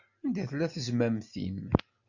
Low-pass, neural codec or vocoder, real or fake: 7.2 kHz; none; real